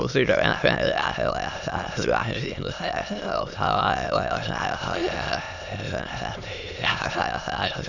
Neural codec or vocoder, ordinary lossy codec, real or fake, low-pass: autoencoder, 22.05 kHz, a latent of 192 numbers a frame, VITS, trained on many speakers; none; fake; 7.2 kHz